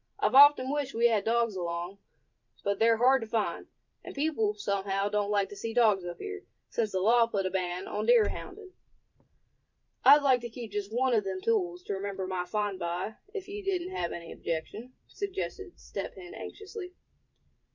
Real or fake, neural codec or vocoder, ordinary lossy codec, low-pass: fake; vocoder, 44.1 kHz, 128 mel bands every 512 samples, BigVGAN v2; MP3, 64 kbps; 7.2 kHz